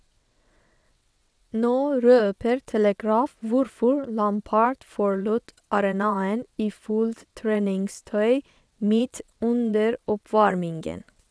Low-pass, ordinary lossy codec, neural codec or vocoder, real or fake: none; none; vocoder, 22.05 kHz, 80 mel bands, WaveNeXt; fake